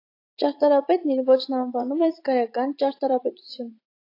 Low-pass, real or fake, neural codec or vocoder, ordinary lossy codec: 5.4 kHz; fake; vocoder, 44.1 kHz, 80 mel bands, Vocos; AAC, 32 kbps